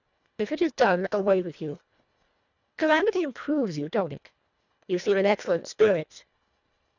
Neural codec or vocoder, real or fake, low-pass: codec, 24 kHz, 1.5 kbps, HILCodec; fake; 7.2 kHz